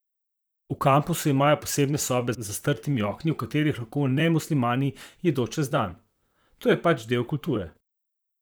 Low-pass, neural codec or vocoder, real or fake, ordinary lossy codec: none; vocoder, 44.1 kHz, 128 mel bands, Pupu-Vocoder; fake; none